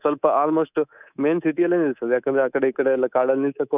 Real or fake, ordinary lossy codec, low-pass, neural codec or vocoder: fake; none; 3.6 kHz; codec, 24 kHz, 3.1 kbps, DualCodec